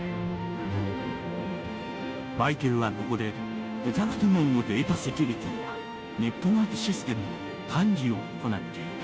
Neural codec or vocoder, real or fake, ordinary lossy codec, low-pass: codec, 16 kHz, 0.5 kbps, FunCodec, trained on Chinese and English, 25 frames a second; fake; none; none